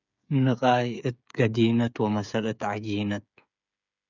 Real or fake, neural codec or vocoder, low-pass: fake; codec, 16 kHz, 8 kbps, FreqCodec, smaller model; 7.2 kHz